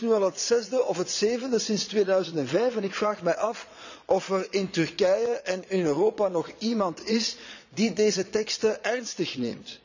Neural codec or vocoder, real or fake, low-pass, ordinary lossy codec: vocoder, 22.05 kHz, 80 mel bands, Vocos; fake; 7.2 kHz; none